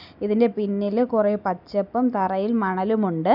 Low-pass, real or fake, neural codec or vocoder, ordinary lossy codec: 5.4 kHz; real; none; none